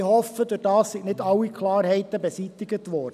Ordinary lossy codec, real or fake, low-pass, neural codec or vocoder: none; real; 14.4 kHz; none